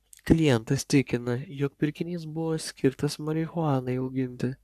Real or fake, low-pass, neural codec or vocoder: fake; 14.4 kHz; codec, 44.1 kHz, 3.4 kbps, Pupu-Codec